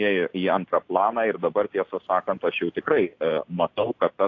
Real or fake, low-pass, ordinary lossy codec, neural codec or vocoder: real; 7.2 kHz; AAC, 48 kbps; none